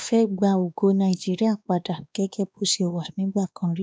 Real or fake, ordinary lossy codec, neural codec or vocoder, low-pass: fake; none; codec, 16 kHz, 2 kbps, X-Codec, WavLM features, trained on Multilingual LibriSpeech; none